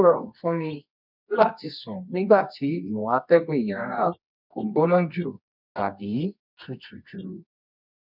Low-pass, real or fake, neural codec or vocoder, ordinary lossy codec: 5.4 kHz; fake; codec, 24 kHz, 0.9 kbps, WavTokenizer, medium music audio release; none